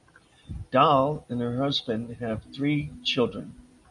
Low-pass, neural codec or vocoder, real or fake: 10.8 kHz; none; real